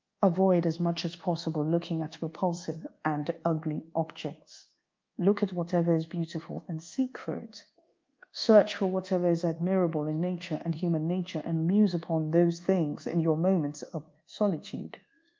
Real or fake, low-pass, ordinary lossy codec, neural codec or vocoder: fake; 7.2 kHz; Opus, 24 kbps; codec, 24 kHz, 1.2 kbps, DualCodec